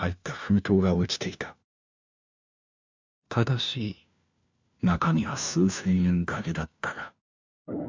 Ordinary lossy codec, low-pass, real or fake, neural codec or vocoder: MP3, 64 kbps; 7.2 kHz; fake; codec, 16 kHz, 1 kbps, FunCodec, trained on LibriTTS, 50 frames a second